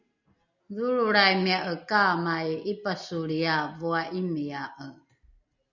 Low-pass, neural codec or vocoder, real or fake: 7.2 kHz; none; real